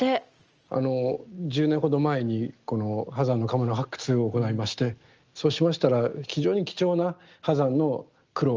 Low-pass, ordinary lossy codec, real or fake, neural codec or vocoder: 7.2 kHz; Opus, 24 kbps; real; none